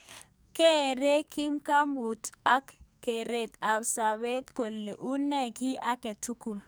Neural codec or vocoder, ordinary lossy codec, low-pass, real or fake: codec, 44.1 kHz, 2.6 kbps, SNAC; none; none; fake